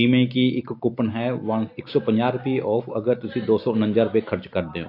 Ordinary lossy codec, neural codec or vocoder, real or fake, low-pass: AAC, 32 kbps; none; real; 5.4 kHz